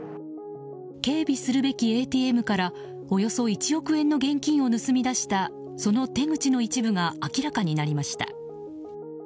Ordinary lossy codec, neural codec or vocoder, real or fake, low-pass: none; none; real; none